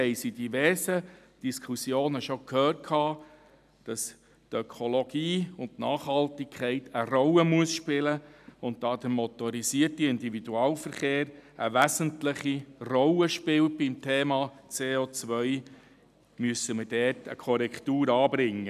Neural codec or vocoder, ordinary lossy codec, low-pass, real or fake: none; none; 14.4 kHz; real